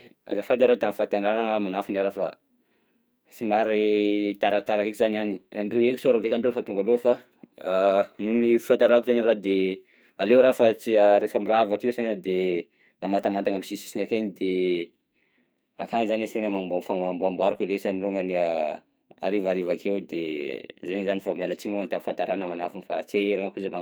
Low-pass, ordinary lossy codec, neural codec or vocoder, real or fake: none; none; codec, 44.1 kHz, 2.6 kbps, SNAC; fake